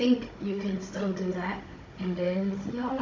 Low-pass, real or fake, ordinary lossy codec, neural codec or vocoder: 7.2 kHz; fake; MP3, 64 kbps; codec, 16 kHz, 16 kbps, FunCodec, trained on Chinese and English, 50 frames a second